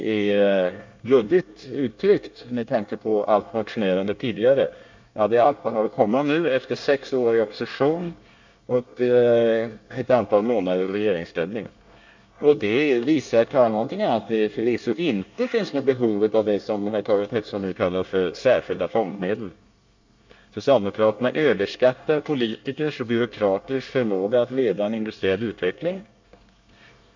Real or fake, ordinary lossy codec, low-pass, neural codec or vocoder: fake; MP3, 64 kbps; 7.2 kHz; codec, 24 kHz, 1 kbps, SNAC